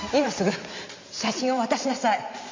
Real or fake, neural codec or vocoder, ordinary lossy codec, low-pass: fake; vocoder, 44.1 kHz, 128 mel bands every 512 samples, BigVGAN v2; MP3, 48 kbps; 7.2 kHz